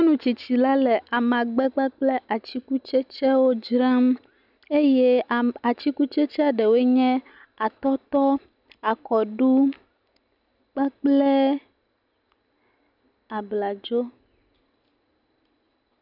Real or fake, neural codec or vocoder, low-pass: real; none; 5.4 kHz